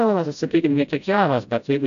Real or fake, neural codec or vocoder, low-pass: fake; codec, 16 kHz, 0.5 kbps, FreqCodec, smaller model; 7.2 kHz